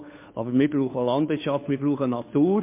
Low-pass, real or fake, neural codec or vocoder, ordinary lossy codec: 3.6 kHz; fake; codec, 16 kHz, 2 kbps, FunCodec, trained on Chinese and English, 25 frames a second; MP3, 24 kbps